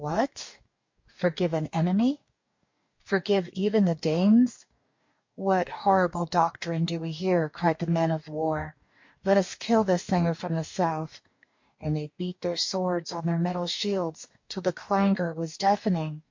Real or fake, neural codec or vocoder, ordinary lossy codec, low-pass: fake; codec, 44.1 kHz, 2.6 kbps, DAC; MP3, 48 kbps; 7.2 kHz